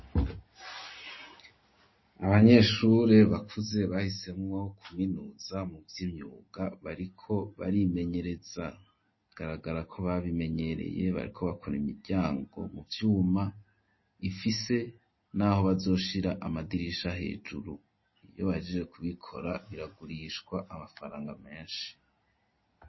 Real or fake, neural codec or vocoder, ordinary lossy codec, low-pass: real; none; MP3, 24 kbps; 7.2 kHz